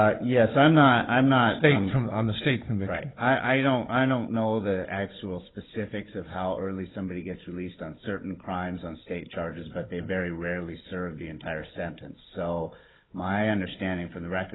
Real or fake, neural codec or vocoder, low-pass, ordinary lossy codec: fake; codec, 16 kHz, 16 kbps, FunCodec, trained on Chinese and English, 50 frames a second; 7.2 kHz; AAC, 16 kbps